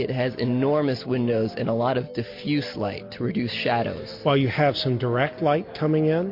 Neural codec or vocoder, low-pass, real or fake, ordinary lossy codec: none; 5.4 kHz; real; MP3, 32 kbps